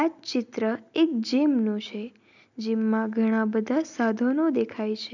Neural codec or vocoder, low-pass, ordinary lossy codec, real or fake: none; 7.2 kHz; none; real